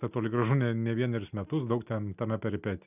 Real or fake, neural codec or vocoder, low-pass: real; none; 3.6 kHz